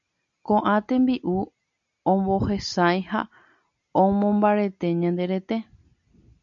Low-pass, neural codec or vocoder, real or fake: 7.2 kHz; none; real